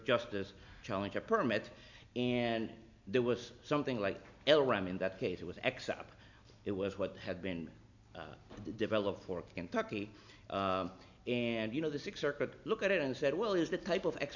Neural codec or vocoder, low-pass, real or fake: none; 7.2 kHz; real